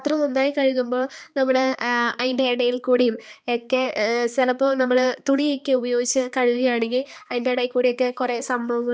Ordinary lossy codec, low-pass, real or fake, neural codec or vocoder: none; none; fake; codec, 16 kHz, 2 kbps, X-Codec, HuBERT features, trained on balanced general audio